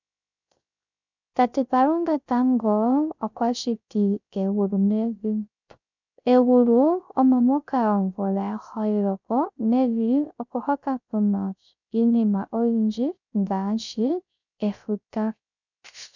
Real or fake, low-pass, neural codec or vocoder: fake; 7.2 kHz; codec, 16 kHz, 0.3 kbps, FocalCodec